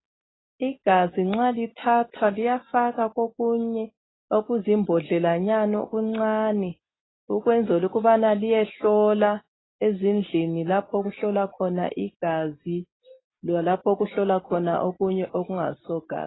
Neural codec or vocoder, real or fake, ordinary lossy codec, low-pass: none; real; AAC, 16 kbps; 7.2 kHz